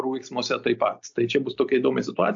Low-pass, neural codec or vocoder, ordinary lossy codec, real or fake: 7.2 kHz; none; AAC, 64 kbps; real